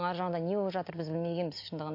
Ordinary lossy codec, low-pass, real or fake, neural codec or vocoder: AAC, 32 kbps; 5.4 kHz; real; none